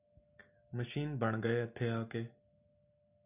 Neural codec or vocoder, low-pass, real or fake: none; 3.6 kHz; real